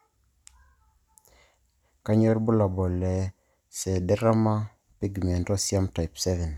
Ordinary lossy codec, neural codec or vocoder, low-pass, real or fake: none; none; 19.8 kHz; real